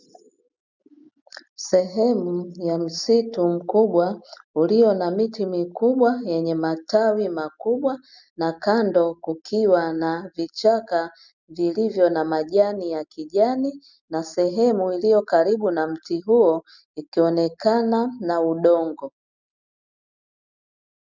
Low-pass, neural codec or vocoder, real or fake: 7.2 kHz; none; real